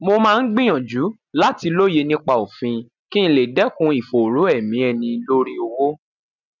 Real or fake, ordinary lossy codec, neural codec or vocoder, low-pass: real; none; none; 7.2 kHz